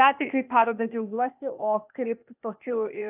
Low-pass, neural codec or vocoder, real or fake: 3.6 kHz; codec, 16 kHz, 0.8 kbps, ZipCodec; fake